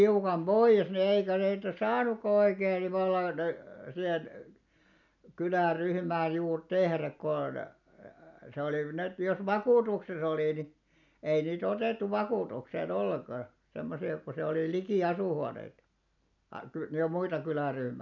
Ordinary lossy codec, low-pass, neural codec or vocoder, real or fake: none; 7.2 kHz; none; real